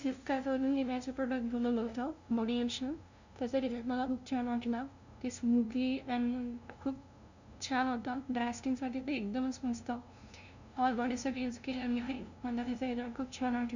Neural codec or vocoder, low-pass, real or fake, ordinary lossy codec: codec, 16 kHz, 0.5 kbps, FunCodec, trained on LibriTTS, 25 frames a second; 7.2 kHz; fake; none